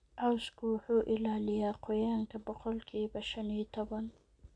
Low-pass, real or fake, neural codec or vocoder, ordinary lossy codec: 9.9 kHz; real; none; MP3, 64 kbps